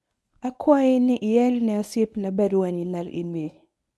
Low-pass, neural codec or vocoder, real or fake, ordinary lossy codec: none; codec, 24 kHz, 0.9 kbps, WavTokenizer, medium speech release version 1; fake; none